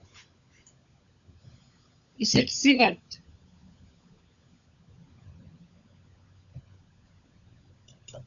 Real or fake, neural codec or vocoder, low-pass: fake; codec, 16 kHz, 16 kbps, FunCodec, trained on LibriTTS, 50 frames a second; 7.2 kHz